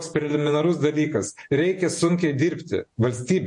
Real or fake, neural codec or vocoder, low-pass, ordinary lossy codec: fake; vocoder, 48 kHz, 128 mel bands, Vocos; 10.8 kHz; MP3, 48 kbps